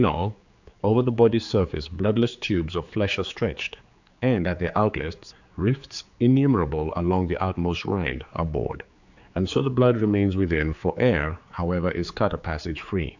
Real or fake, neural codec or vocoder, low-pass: fake; codec, 16 kHz, 4 kbps, X-Codec, HuBERT features, trained on general audio; 7.2 kHz